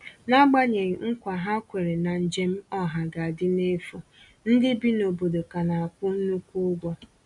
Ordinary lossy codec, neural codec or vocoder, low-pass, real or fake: none; none; 10.8 kHz; real